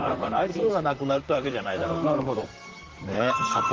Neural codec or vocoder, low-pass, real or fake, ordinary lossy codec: vocoder, 44.1 kHz, 128 mel bands, Pupu-Vocoder; 7.2 kHz; fake; Opus, 16 kbps